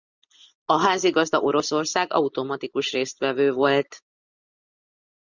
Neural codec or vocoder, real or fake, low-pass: none; real; 7.2 kHz